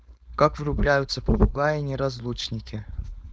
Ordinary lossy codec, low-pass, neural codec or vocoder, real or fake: none; none; codec, 16 kHz, 4.8 kbps, FACodec; fake